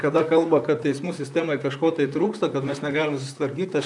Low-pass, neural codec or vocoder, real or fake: 10.8 kHz; vocoder, 44.1 kHz, 128 mel bands, Pupu-Vocoder; fake